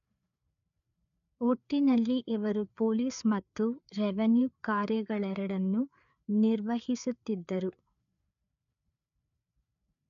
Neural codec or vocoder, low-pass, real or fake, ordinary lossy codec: codec, 16 kHz, 4 kbps, FreqCodec, larger model; 7.2 kHz; fake; none